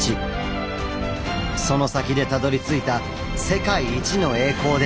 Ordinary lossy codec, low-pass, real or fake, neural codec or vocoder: none; none; real; none